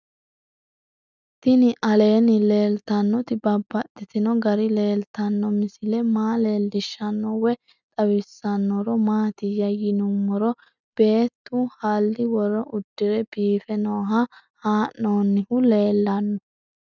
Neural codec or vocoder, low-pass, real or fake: none; 7.2 kHz; real